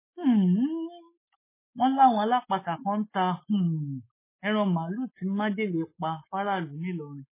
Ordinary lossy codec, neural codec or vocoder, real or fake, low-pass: MP3, 16 kbps; autoencoder, 48 kHz, 128 numbers a frame, DAC-VAE, trained on Japanese speech; fake; 3.6 kHz